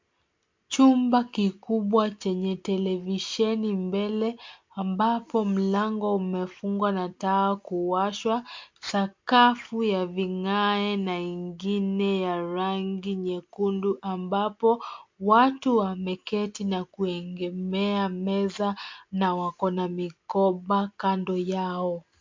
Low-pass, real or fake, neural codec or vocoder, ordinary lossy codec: 7.2 kHz; real; none; MP3, 48 kbps